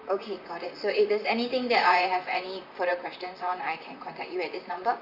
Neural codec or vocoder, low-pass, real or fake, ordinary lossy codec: vocoder, 44.1 kHz, 128 mel bands, Pupu-Vocoder; 5.4 kHz; fake; Opus, 64 kbps